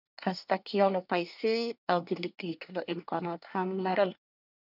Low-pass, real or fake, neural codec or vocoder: 5.4 kHz; fake; codec, 24 kHz, 1 kbps, SNAC